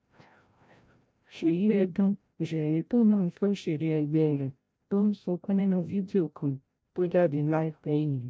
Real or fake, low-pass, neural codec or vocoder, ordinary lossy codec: fake; none; codec, 16 kHz, 0.5 kbps, FreqCodec, larger model; none